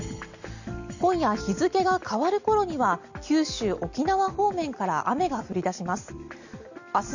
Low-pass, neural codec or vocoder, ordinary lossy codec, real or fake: 7.2 kHz; none; none; real